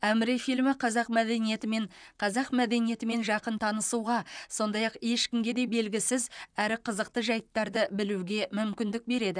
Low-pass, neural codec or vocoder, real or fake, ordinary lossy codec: 9.9 kHz; vocoder, 44.1 kHz, 128 mel bands, Pupu-Vocoder; fake; none